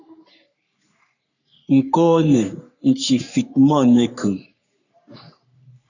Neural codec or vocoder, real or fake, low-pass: codec, 44.1 kHz, 3.4 kbps, Pupu-Codec; fake; 7.2 kHz